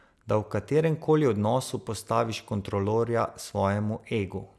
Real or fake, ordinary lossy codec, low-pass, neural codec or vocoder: real; none; none; none